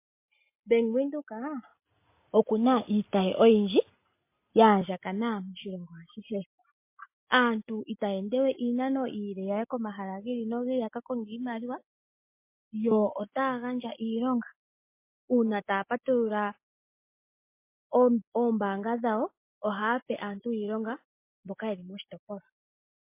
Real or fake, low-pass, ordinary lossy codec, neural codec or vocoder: real; 3.6 kHz; MP3, 24 kbps; none